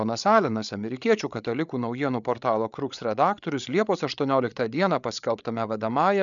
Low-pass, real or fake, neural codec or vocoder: 7.2 kHz; fake; codec, 16 kHz, 8 kbps, FreqCodec, larger model